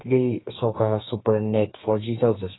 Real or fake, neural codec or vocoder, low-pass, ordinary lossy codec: fake; codec, 32 kHz, 1.9 kbps, SNAC; 7.2 kHz; AAC, 16 kbps